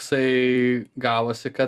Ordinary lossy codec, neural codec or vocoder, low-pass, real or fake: Opus, 64 kbps; vocoder, 44.1 kHz, 128 mel bands every 256 samples, BigVGAN v2; 14.4 kHz; fake